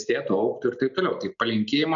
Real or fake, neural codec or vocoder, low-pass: real; none; 7.2 kHz